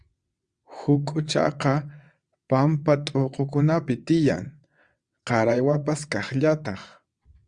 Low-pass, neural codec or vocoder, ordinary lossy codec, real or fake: 9.9 kHz; vocoder, 22.05 kHz, 80 mel bands, WaveNeXt; Opus, 64 kbps; fake